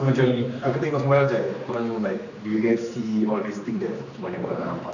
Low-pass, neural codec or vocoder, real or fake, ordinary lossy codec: 7.2 kHz; codec, 16 kHz, 2 kbps, X-Codec, HuBERT features, trained on general audio; fake; none